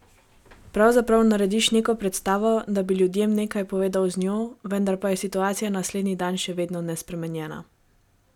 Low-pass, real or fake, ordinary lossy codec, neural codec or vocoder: 19.8 kHz; real; none; none